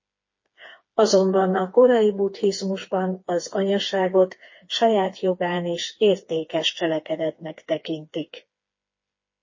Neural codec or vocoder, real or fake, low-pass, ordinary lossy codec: codec, 16 kHz, 4 kbps, FreqCodec, smaller model; fake; 7.2 kHz; MP3, 32 kbps